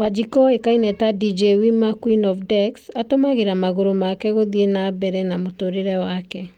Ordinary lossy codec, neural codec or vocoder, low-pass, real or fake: Opus, 32 kbps; none; 19.8 kHz; real